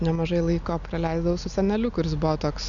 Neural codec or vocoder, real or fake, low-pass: none; real; 7.2 kHz